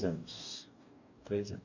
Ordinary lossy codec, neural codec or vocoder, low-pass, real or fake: none; codec, 44.1 kHz, 2.6 kbps, DAC; 7.2 kHz; fake